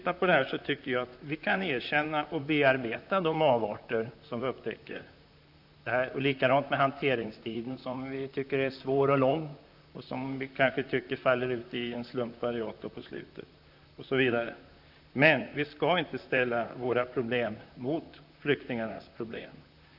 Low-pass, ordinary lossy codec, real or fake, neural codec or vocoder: 5.4 kHz; none; fake; vocoder, 44.1 kHz, 128 mel bands, Pupu-Vocoder